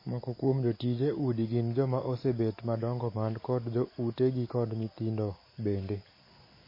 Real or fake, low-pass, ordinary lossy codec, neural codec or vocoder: real; 5.4 kHz; MP3, 24 kbps; none